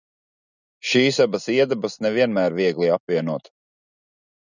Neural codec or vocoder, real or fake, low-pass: none; real; 7.2 kHz